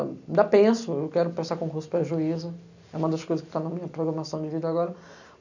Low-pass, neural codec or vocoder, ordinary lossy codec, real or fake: 7.2 kHz; none; none; real